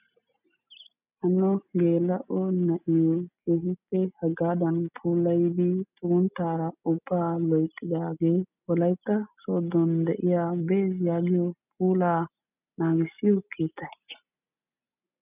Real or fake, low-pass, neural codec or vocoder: real; 3.6 kHz; none